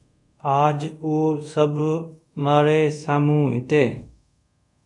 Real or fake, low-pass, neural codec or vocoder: fake; 10.8 kHz; codec, 24 kHz, 0.5 kbps, DualCodec